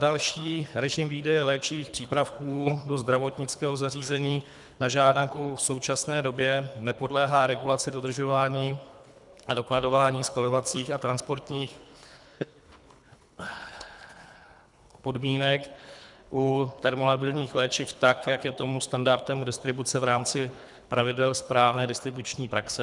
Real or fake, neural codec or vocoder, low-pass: fake; codec, 24 kHz, 3 kbps, HILCodec; 10.8 kHz